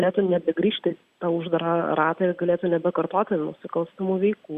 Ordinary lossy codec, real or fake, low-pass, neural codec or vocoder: Opus, 64 kbps; real; 14.4 kHz; none